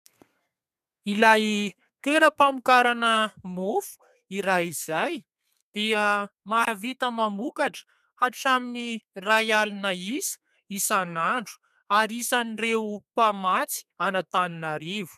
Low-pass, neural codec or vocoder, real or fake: 14.4 kHz; codec, 32 kHz, 1.9 kbps, SNAC; fake